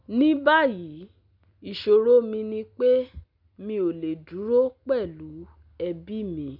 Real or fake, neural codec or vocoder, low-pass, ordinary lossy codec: real; none; 5.4 kHz; none